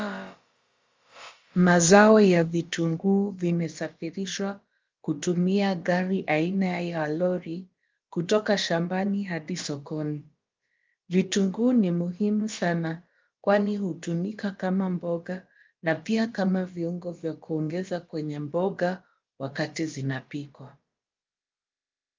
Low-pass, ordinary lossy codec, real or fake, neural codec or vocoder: 7.2 kHz; Opus, 32 kbps; fake; codec, 16 kHz, about 1 kbps, DyCAST, with the encoder's durations